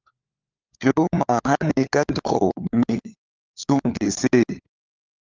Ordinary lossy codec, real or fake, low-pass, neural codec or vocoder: Opus, 24 kbps; fake; 7.2 kHz; codec, 16 kHz, 4 kbps, FunCodec, trained on LibriTTS, 50 frames a second